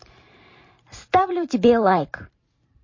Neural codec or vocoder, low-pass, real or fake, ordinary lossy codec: vocoder, 44.1 kHz, 128 mel bands every 512 samples, BigVGAN v2; 7.2 kHz; fake; MP3, 32 kbps